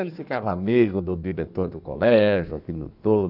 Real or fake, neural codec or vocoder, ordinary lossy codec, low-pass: fake; codec, 16 kHz in and 24 kHz out, 1.1 kbps, FireRedTTS-2 codec; none; 5.4 kHz